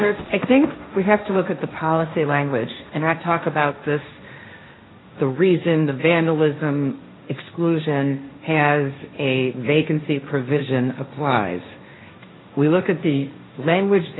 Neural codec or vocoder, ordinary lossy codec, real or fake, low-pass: codec, 16 kHz, 1.1 kbps, Voila-Tokenizer; AAC, 16 kbps; fake; 7.2 kHz